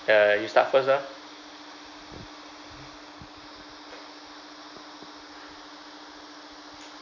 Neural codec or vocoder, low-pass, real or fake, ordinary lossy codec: none; 7.2 kHz; real; none